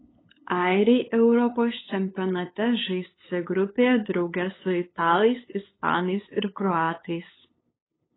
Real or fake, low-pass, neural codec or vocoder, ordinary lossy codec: fake; 7.2 kHz; codec, 16 kHz, 8 kbps, FunCodec, trained on LibriTTS, 25 frames a second; AAC, 16 kbps